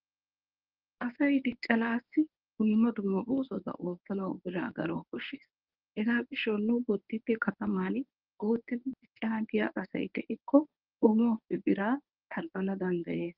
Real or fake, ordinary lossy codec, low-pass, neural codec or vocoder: fake; Opus, 24 kbps; 5.4 kHz; codec, 24 kHz, 0.9 kbps, WavTokenizer, medium speech release version 1